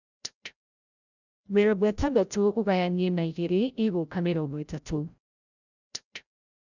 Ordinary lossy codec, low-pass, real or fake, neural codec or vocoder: none; 7.2 kHz; fake; codec, 16 kHz, 0.5 kbps, FreqCodec, larger model